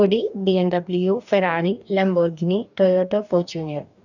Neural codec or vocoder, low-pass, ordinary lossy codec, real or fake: codec, 44.1 kHz, 2.6 kbps, DAC; 7.2 kHz; none; fake